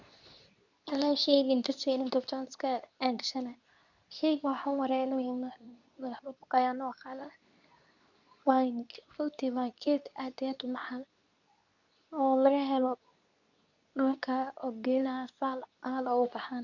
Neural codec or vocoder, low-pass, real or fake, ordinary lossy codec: codec, 24 kHz, 0.9 kbps, WavTokenizer, medium speech release version 2; 7.2 kHz; fake; none